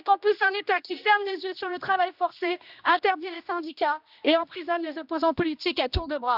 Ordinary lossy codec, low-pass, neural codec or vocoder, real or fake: none; 5.4 kHz; codec, 16 kHz, 1 kbps, X-Codec, HuBERT features, trained on general audio; fake